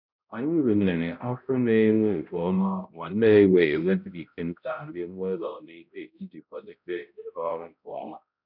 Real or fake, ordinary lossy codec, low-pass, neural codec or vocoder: fake; none; 5.4 kHz; codec, 16 kHz, 0.5 kbps, X-Codec, HuBERT features, trained on balanced general audio